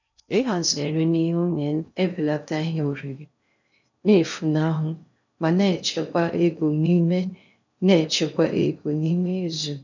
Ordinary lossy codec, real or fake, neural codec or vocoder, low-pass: none; fake; codec, 16 kHz in and 24 kHz out, 0.6 kbps, FocalCodec, streaming, 2048 codes; 7.2 kHz